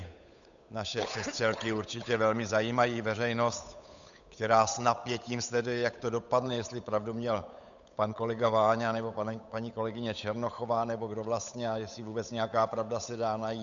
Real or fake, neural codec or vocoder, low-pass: fake; codec, 16 kHz, 8 kbps, FunCodec, trained on Chinese and English, 25 frames a second; 7.2 kHz